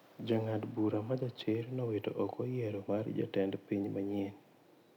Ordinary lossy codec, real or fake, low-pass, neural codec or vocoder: none; real; 19.8 kHz; none